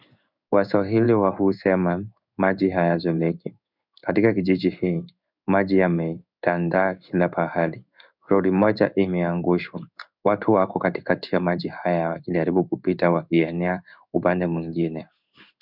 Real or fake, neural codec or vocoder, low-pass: fake; codec, 16 kHz in and 24 kHz out, 1 kbps, XY-Tokenizer; 5.4 kHz